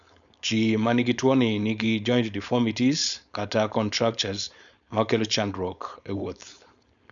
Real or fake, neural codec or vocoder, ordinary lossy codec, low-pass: fake; codec, 16 kHz, 4.8 kbps, FACodec; none; 7.2 kHz